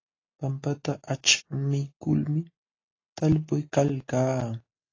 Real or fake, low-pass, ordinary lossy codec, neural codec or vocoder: real; 7.2 kHz; AAC, 32 kbps; none